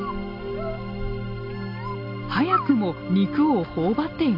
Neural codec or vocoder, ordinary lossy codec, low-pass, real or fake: none; none; 5.4 kHz; real